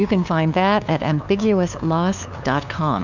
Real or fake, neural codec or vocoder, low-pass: fake; codec, 16 kHz, 2 kbps, FunCodec, trained on LibriTTS, 25 frames a second; 7.2 kHz